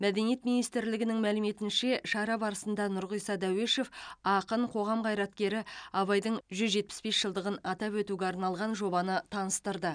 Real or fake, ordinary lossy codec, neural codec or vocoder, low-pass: real; none; none; 9.9 kHz